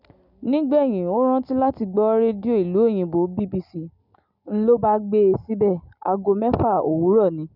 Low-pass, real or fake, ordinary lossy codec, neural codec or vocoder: 5.4 kHz; real; none; none